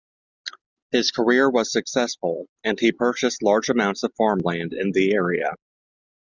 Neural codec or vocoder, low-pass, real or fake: none; 7.2 kHz; real